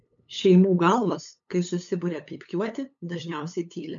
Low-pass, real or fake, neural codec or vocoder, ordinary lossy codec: 7.2 kHz; fake; codec, 16 kHz, 8 kbps, FunCodec, trained on LibriTTS, 25 frames a second; MP3, 64 kbps